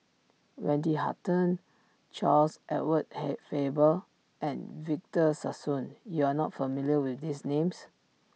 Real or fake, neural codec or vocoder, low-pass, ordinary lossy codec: real; none; none; none